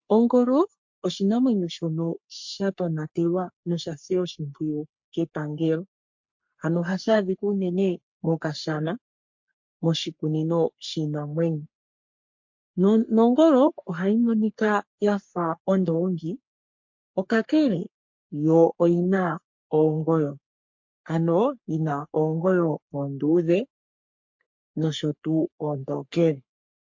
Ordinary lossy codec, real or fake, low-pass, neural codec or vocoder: MP3, 48 kbps; fake; 7.2 kHz; codec, 44.1 kHz, 3.4 kbps, Pupu-Codec